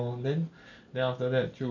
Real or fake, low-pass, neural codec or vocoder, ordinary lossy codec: real; 7.2 kHz; none; none